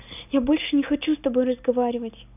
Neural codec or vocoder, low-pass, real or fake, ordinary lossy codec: none; 3.6 kHz; real; none